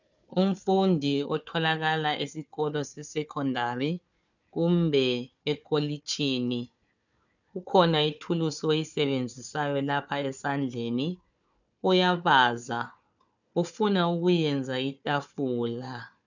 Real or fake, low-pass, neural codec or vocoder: fake; 7.2 kHz; codec, 16 kHz, 4 kbps, FunCodec, trained on Chinese and English, 50 frames a second